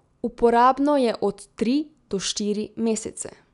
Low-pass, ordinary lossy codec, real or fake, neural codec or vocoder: 10.8 kHz; none; real; none